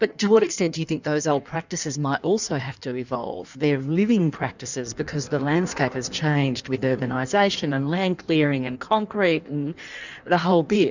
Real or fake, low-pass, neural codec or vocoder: fake; 7.2 kHz; codec, 16 kHz in and 24 kHz out, 1.1 kbps, FireRedTTS-2 codec